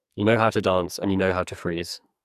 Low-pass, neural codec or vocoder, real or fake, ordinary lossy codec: 14.4 kHz; codec, 44.1 kHz, 2.6 kbps, SNAC; fake; none